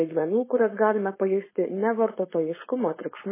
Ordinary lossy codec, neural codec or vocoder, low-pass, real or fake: MP3, 16 kbps; codec, 16 kHz, 4.8 kbps, FACodec; 3.6 kHz; fake